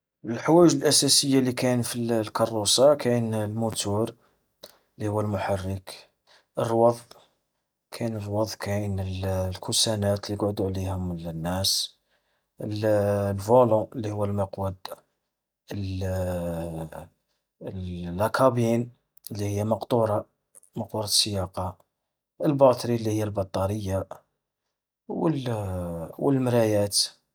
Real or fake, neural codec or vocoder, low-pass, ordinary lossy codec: real; none; none; none